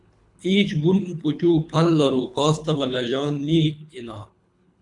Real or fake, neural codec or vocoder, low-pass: fake; codec, 24 kHz, 3 kbps, HILCodec; 10.8 kHz